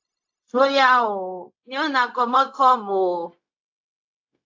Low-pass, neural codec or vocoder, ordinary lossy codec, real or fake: 7.2 kHz; codec, 16 kHz, 0.4 kbps, LongCat-Audio-Codec; AAC, 48 kbps; fake